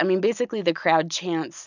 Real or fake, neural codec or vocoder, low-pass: real; none; 7.2 kHz